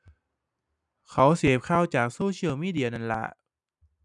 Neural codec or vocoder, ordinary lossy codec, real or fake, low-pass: none; none; real; 10.8 kHz